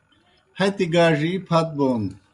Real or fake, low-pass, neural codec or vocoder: real; 10.8 kHz; none